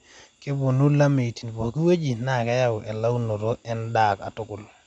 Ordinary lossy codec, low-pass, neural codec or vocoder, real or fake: none; 9.9 kHz; none; real